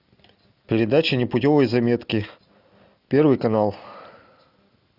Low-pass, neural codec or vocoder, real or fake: 5.4 kHz; none; real